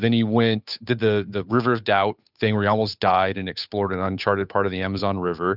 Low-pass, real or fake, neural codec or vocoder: 5.4 kHz; fake; codec, 16 kHz, 8 kbps, FunCodec, trained on Chinese and English, 25 frames a second